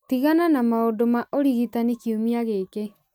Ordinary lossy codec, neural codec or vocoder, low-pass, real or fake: none; none; none; real